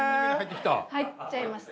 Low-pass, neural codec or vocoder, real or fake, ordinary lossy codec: none; none; real; none